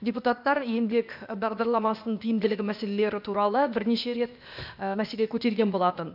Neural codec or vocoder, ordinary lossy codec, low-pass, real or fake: codec, 16 kHz, 0.8 kbps, ZipCodec; none; 5.4 kHz; fake